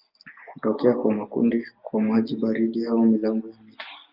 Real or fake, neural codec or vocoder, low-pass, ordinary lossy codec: real; none; 5.4 kHz; Opus, 24 kbps